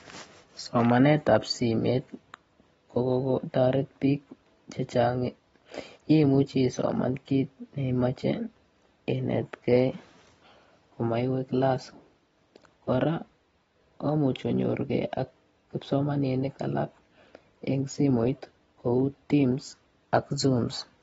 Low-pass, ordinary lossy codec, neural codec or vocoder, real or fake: 19.8 kHz; AAC, 24 kbps; none; real